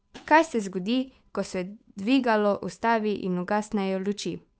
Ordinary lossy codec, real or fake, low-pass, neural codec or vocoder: none; fake; none; codec, 16 kHz, 8 kbps, FunCodec, trained on Chinese and English, 25 frames a second